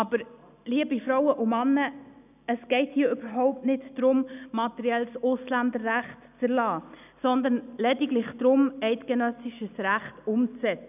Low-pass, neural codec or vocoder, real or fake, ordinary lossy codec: 3.6 kHz; none; real; none